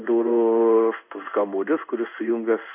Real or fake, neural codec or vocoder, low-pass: fake; codec, 16 kHz in and 24 kHz out, 1 kbps, XY-Tokenizer; 3.6 kHz